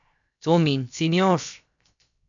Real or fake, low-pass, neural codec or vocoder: fake; 7.2 kHz; codec, 16 kHz, 0.7 kbps, FocalCodec